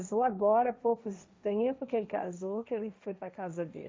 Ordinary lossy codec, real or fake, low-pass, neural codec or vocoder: none; fake; none; codec, 16 kHz, 1.1 kbps, Voila-Tokenizer